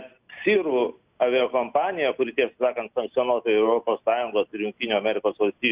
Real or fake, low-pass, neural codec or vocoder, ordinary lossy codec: real; 3.6 kHz; none; Opus, 64 kbps